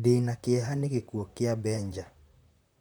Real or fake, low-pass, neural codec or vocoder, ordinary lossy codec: fake; none; vocoder, 44.1 kHz, 128 mel bands, Pupu-Vocoder; none